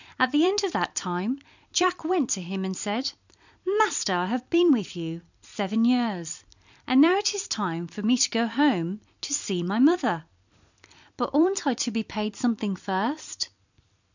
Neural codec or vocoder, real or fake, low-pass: vocoder, 44.1 kHz, 128 mel bands every 256 samples, BigVGAN v2; fake; 7.2 kHz